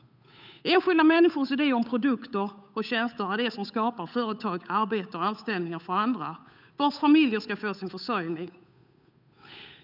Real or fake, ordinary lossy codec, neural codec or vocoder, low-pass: fake; none; codec, 16 kHz, 8 kbps, FunCodec, trained on Chinese and English, 25 frames a second; 5.4 kHz